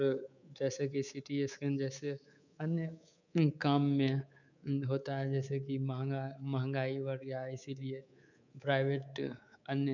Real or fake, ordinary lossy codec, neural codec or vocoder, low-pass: fake; none; codec, 24 kHz, 3.1 kbps, DualCodec; 7.2 kHz